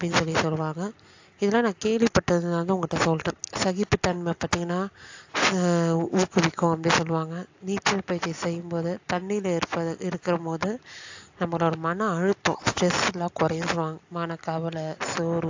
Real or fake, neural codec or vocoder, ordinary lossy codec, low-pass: real; none; AAC, 48 kbps; 7.2 kHz